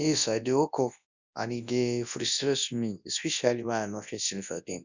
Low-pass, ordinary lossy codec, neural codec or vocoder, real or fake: 7.2 kHz; none; codec, 24 kHz, 0.9 kbps, WavTokenizer, large speech release; fake